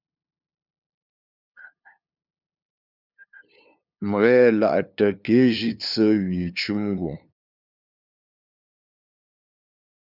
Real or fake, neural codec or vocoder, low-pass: fake; codec, 16 kHz, 2 kbps, FunCodec, trained on LibriTTS, 25 frames a second; 5.4 kHz